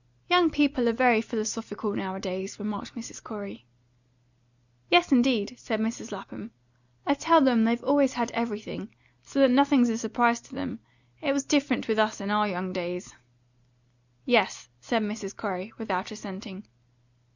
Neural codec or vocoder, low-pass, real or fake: none; 7.2 kHz; real